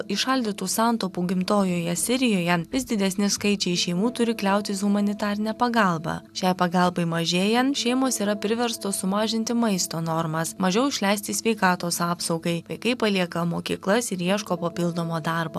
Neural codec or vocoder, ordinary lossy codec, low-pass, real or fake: none; AAC, 96 kbps; 14.4 kHz; real